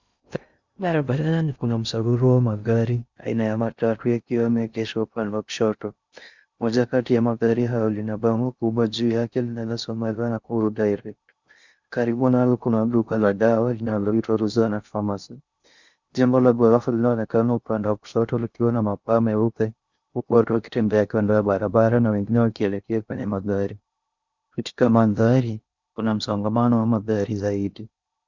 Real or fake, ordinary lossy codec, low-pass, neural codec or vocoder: fake; Opus, 64 kbps; 7.2 kHz; codec, 16 kHz in and 24 kHz out, 0.6 kbps, FocalCodec, streaming, 2048 codes